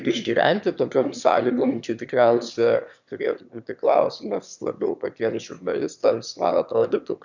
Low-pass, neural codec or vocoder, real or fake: 7.2 kHz; autoencoder, 22.05 kHz, a latent of 192 numbers a frame, VITS, trained on one speaker; fake